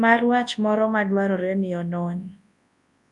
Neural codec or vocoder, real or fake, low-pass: codec, 24 kHz, 0.9 kbps, WavTokenizer, large speech release; fake; 10.8 kHz